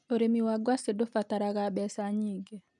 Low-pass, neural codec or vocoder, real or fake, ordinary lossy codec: 10.8 kHz; none; real; none